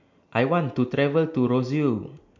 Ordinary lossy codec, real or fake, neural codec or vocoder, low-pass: MP3, 48 kbps; real; none; 7.2 kHz